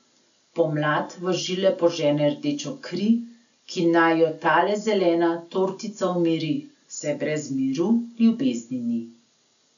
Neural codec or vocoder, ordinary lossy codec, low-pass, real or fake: none; none; 7.2 kHz; real